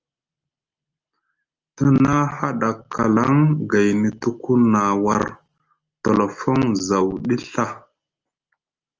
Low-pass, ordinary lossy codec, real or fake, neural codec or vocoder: 7.2 kHz; Opus, 24 kbps; real; none